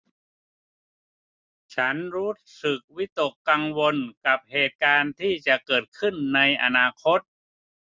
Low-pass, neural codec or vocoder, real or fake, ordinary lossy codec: none; none; real; none